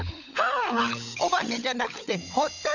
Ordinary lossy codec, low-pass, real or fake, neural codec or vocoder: none; 7.2 kHz; fake; codec, 16 kHz, 16 kbps, FunCodec, trained on LibriTTS, 50 frames a second